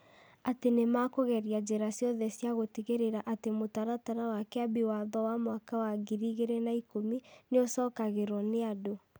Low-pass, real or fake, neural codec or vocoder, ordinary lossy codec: none; real; none; none